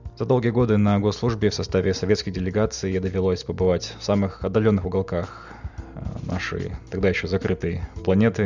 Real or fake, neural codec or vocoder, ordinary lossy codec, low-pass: real; none; none; 7.2 kHz